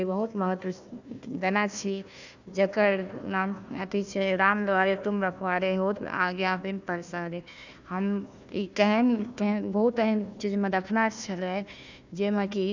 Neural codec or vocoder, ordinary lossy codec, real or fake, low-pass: codec, 16 kHz, 1 kbps, FunCodec, trained on Chinese and English, 50 frames a second; none; fake; 7.2 kHz